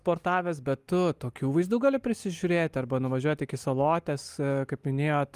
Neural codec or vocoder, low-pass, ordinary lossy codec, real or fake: none; 14.4 kHz; Opus, 32 kbps; real